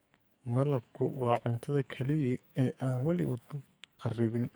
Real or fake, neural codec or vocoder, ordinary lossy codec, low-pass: fake; codec, 44.1 kHz, 2.6 kbps, SNAC; none; none